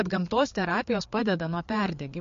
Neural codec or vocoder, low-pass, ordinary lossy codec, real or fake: codec, 16 kHz, 4 kbps, FreqCodec, larger model; 7.2 kHz; MP3, 48 kbps; fake